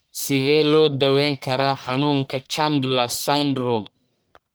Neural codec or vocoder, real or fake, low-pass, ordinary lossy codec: codec, 44.1 kHz, 1.7 kbps, Pupu-Codec; fake; none; none